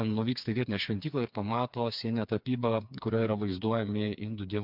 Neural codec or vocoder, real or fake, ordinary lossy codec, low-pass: codec, 16 kHz, 4 kbps, FreqCodec, smaller model; fake; MP3, 48 kbps; 5.4 kHz